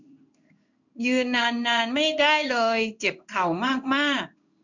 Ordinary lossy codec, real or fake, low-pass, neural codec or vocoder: none; fake; 7.2 kHz; codec, 16 kHz in and 24 kHz out, 1 kbps, XY-Tokenizer